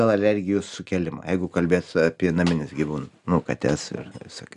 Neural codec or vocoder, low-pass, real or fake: none; 10.8 kHz; real